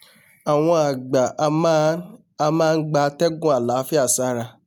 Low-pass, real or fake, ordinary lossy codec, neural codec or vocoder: none; real; none; none